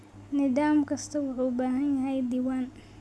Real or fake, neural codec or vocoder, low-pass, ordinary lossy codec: real; none; none; none